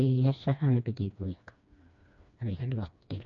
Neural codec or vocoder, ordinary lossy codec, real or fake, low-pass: codec, 16 kHz, 2 kbps, FreqCodec, smaller model; AAC, 64 kbps; fake; 7.2 kHz